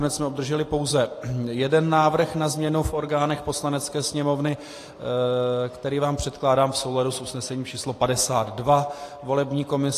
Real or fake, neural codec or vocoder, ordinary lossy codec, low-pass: real; none; AAC, 48 kbps; 14.4 kHz